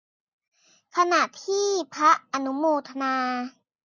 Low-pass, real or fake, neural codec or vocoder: 7.2 kHz; real; none